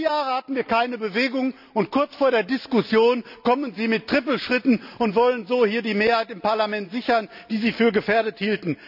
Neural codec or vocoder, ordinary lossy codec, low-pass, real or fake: none; none; 5.4 kHz; real